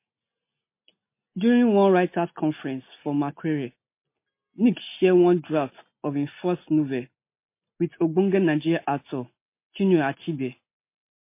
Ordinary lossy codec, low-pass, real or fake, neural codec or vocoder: MP3, 24 kbps; 3.6 kHz; real; none